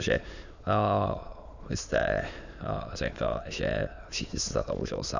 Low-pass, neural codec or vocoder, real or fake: 7.2 kHz; autoencoder, 22.05 kHz, a latent of 192 numbers a frame, VITS, trained on many speakers; fake